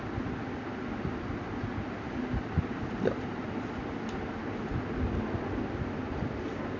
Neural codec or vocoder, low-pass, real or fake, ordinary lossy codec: none; 7.2 kHz; real; none